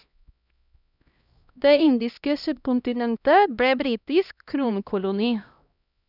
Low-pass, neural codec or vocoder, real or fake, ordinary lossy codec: 5.4 kHz; codec, 16 kHz, 1 kbps, X-Codec, HuBERT features, trained on LibriSpeech; fake; none